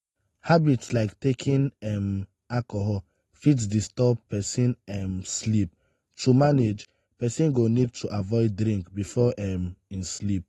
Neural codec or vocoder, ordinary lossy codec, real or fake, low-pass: none; AAC, 32 kbps; real; 19.8 kHz